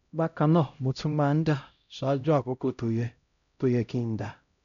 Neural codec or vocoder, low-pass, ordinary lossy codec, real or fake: codec, 16 kHz, 0.5 kbps, X-Codec, HuBERT features, trained on LibriSpeech; 7.2 kHz; none; fake